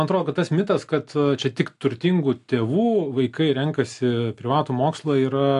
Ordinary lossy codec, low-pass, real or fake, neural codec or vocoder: AAC, 64 kbps; 10.8 kHz; real; none